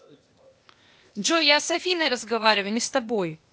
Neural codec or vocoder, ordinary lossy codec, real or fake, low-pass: codec, 16 kHz, 0.8 kbps, ZipCodec; none; fake; none